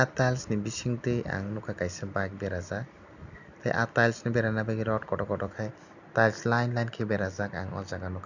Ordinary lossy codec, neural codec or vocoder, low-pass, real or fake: none; none; 7.2 kHz; real